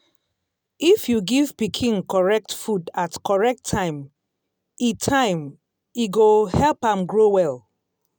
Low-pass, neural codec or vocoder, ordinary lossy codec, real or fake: none; none; none; real